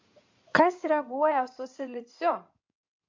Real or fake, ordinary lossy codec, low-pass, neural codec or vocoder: fake; MP3, 48 kbps; 7.2 kHz; codec, 16 kHz in and 24 kHz out, 2.2 kbps, FireRedTTS-2 codec